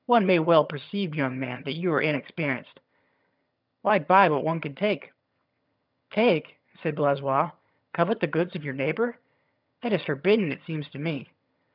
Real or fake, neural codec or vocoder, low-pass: fake; vocoder, 22.05 kHz, 80 mel bands, HiFi-GAN; 5.4 kHz